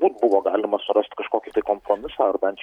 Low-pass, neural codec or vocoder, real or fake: 19.8 kHz; none; real